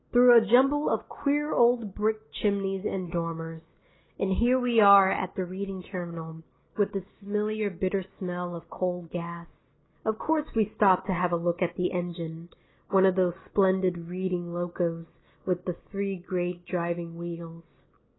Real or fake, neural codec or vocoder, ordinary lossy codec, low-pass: real; none; AAC, 16 kbps; 7.2 kHz